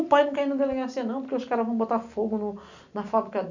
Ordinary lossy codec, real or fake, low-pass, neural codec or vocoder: none; real; 7.2 kHz; none